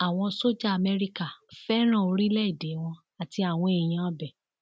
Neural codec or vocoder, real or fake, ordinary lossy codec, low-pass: none; real; none; none